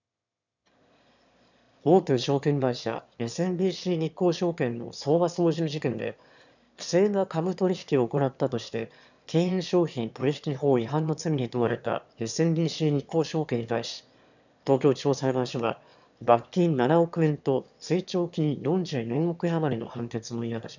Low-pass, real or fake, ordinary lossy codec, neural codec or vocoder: 7.2 kHz; fake; none; autoencoder, 22.05 kHz, a latent of 192 numbers a frame, VITS, trained on one speaker